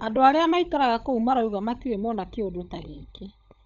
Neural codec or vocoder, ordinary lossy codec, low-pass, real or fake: codec, 16 kHz, 16 kbps, FunCodec, trained on LibriTTS, 50 frames a second; none; 7.2 kHz; fake